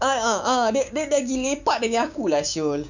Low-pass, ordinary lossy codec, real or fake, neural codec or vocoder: 7.2 kHz; none; fake; codec, 16 kHz, 6 kbps, DAC